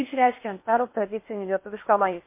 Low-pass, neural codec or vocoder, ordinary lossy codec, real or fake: 3.6 kHz; codec, 16 kHz in and 24 kHz out, 0.6 kbps, FocalCodec, streaming, 4096 codes; MP3, 24 kbps; fake